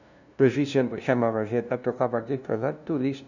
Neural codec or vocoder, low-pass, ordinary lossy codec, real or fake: codec, 16 kHz, 0.5 kbps, FunCodec, trained on LibriTTS, 25 frames a second; 7.2 kHz; none; fake